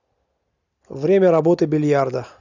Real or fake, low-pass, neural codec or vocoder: real; 7.2 kHz; none